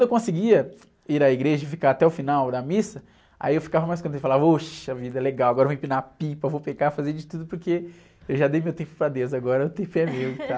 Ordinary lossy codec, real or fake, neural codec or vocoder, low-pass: none; real; none; none